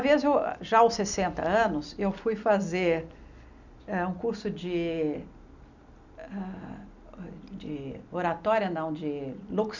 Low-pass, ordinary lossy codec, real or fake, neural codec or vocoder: 7.2 kHz; none; real; none